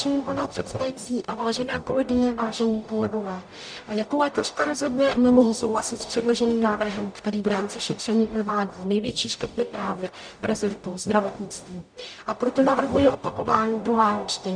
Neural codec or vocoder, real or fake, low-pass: codec, 44.1 kHz, 0.9 kbps, DAC; fake; 9.9 kHz